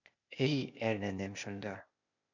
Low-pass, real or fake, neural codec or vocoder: 7.2 kHz; fake; codec, 16 kHz, 0.8 kbps, ZipCodec